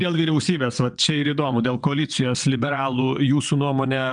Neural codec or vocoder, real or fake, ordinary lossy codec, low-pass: vocoder, 22.05 kHz, 80 mel bands, WaveNeXt; fake; MP3, 96 kbps; 9.9 kHz